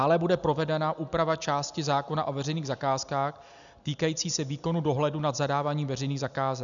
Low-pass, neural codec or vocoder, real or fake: 7.2 kHz; none; real